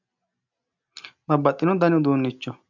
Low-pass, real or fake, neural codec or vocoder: 7.2 kHz; real; none